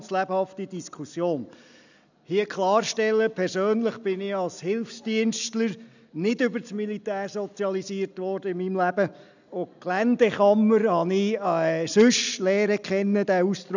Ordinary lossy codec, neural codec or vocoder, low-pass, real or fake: none; none; 7.2 kHz; real